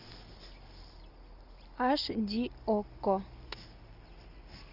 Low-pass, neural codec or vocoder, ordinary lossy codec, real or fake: 5.4 kHz; none; Opus, 64 kbps; real